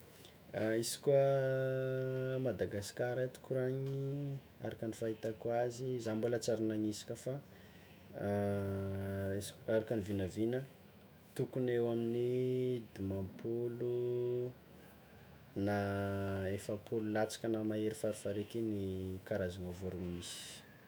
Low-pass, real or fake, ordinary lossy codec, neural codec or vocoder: none; fake; none; autoencoder, 48 kHz, 128 numbers a frame, DAC-VAE, trained on Japanese speech